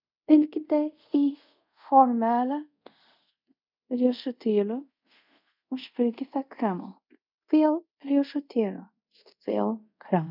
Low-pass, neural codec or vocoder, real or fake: 5.4 kHz; codec, 24 kHz, 0.5 kbps, DualCodec; fake